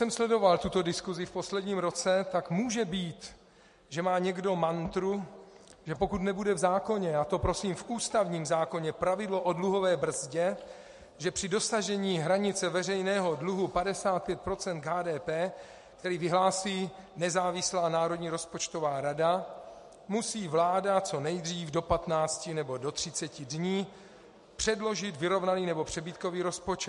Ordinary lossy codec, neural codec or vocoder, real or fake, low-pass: MP3, 48 kbps; none; real; 14.4 kHz